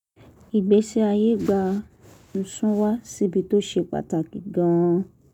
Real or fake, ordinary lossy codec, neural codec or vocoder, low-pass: real; none; none; 19.8 kHz